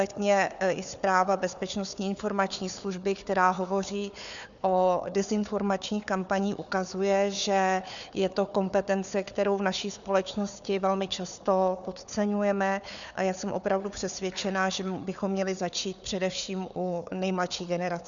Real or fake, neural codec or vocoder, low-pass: fake; codec, 16 kHz, 4 kbps, FunCodec, trained on Chinese and English, 50 frames a second; 7.2 kHz